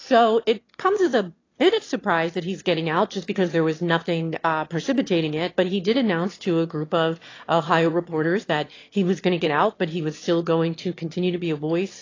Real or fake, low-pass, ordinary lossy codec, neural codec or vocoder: fake; 7.2 kHz; AAC, 32 kbps; autoencoder, 22.05 kHz, a latent of 192 numbers a frame, VITS, trained on one speaker